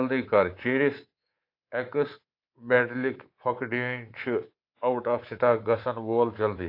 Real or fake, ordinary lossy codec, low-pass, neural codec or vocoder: fake; AAC, 32 kbps; 5.4 kHz; codec, 24 kHz, 3.1 kbps, DualCodec